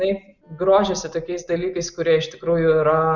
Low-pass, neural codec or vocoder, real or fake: 7.2 kHz; none; real